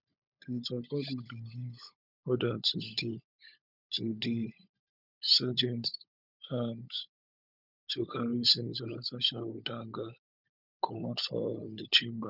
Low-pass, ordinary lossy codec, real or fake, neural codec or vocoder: 5.4 kHz; none; fake; codec, 16 kHz, 16 kbps, FunCodec, trained on LibriTTS, 50 frames a second